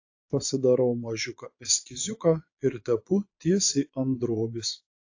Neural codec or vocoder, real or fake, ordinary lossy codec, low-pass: vocoder, 22.05 kHz, 80 mel bands, Vocos; fake; AAC, 48 kbps; 7.2 kHz